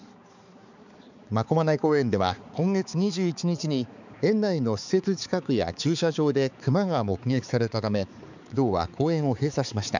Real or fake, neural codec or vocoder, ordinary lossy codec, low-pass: fake; codec, 16 kHz, 4 kbps, X-Codec, HuBERT features, trained on balanced general audio; none; 7.2 kHz